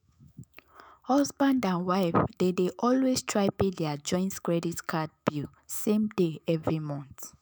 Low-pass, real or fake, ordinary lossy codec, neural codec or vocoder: none; fake; none; autoencoder, 48 kHz, 128 numbers a frame, DAC-VAE, trained on Japanese speech